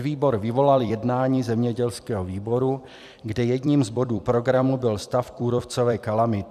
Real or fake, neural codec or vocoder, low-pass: fake; vocoder, 44.1 kHz, 128 mel bands every 256 samples, BigVGAN v2; 14.4 kHz